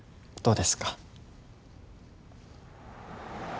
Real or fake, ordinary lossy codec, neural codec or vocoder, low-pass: real; none; none; none